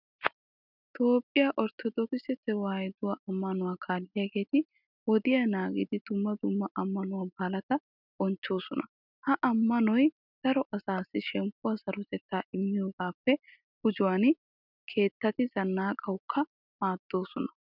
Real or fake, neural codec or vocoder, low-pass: real; none; 5.4 kHz